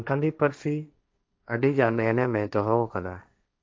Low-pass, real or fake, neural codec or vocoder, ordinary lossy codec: none; fake; codec, 16 kHz, 1.1 kbps, Voila-Tokenizer; none